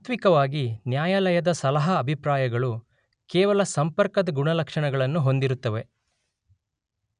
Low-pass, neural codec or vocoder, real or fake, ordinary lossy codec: 9.9 kHz; none; real; none